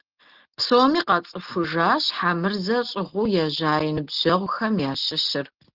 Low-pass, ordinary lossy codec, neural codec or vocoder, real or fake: 5.4 kHz; Opus, 16 kbps; none; real